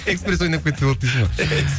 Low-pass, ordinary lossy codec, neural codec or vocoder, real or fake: none; none; none; real